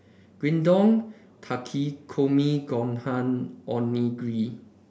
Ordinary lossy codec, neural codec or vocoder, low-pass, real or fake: none; none; none; real